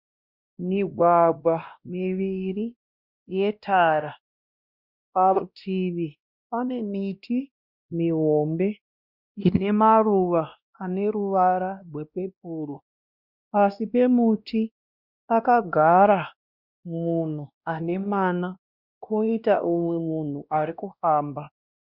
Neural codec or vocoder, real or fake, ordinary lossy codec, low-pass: codec, 16 kHz, 1 kbps, X-Codec, WavLM features, trained on Multilingual LibriSpeech; fake; Opus, 64 kbps; 5.4 kHz